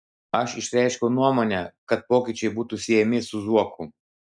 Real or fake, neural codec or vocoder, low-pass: real; none; 9.9 kHz